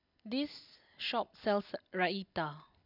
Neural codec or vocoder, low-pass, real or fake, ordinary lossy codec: none; 5.4 kHz; real; none